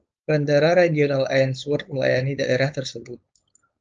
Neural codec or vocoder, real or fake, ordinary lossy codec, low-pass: codec, 16 kHz, 4.8 kbps, FACodec; fake; Opus, 24 kbps; 7.2 kHz